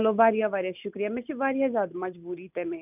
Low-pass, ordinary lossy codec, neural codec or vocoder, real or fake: 3.6 kHz; none; none; real